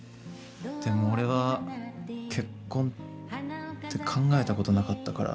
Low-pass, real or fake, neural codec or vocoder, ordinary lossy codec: none; real; none; none